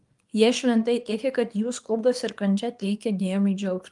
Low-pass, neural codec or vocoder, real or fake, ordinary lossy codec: 10.8 kHz; codec, 24 kHz, 0.9 kbps, WavTokenizer, small release; fake; Opus, 32 kbps